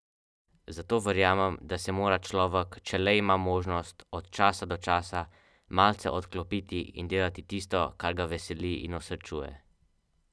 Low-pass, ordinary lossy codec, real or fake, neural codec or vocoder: none; none; real; none